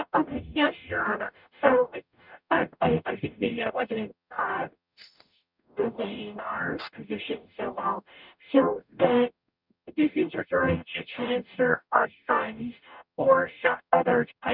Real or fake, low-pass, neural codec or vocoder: fake; 5.4 kHz; codec, 44.1 kHz, 0.9 kbps, DAC